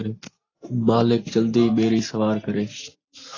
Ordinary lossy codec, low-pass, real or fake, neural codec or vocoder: AAC, 32 kbps; 7.2 kHz; real; none